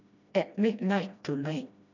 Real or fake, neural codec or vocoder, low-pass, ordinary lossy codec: fake; codec, 16 kHz, 1 kbps, FreqCodec, smaller model; 7.2 kHz; none